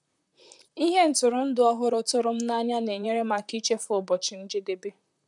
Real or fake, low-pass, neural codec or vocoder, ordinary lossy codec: fake; 10.8 kHz; vocoder, 44.1 kHz, 128 mel bands, Pupu-Vocoder; none